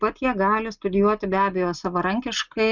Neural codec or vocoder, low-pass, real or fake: none; 7.2 kHz; real